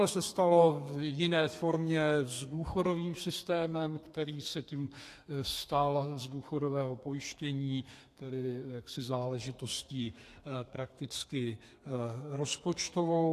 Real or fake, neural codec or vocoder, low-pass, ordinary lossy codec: fake; codec, 32 kHz, 1.9 kbps, SNAC; 14.4 kHz; AAC, 64 kbps